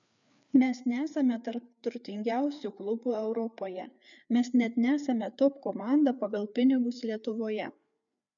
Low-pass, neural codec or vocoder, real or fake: 7.2 kHz; codec, 16 kHz, 4 kbps, FreqCodec, larger model; fake